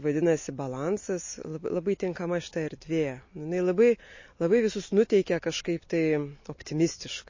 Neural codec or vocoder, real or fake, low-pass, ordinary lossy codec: none; real; 7.2 kHz; MP3, 32 kbps